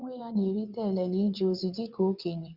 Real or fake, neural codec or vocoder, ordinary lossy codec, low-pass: fake; vocoder, 22.05 kHz, 80 mel bands, WaveNeXt; Opus, 64 kbps; 5.4 kHz